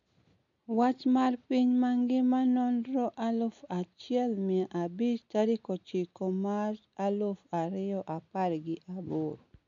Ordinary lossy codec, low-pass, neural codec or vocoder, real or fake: MP3, 96 kbps; 7.2 kHz; none; real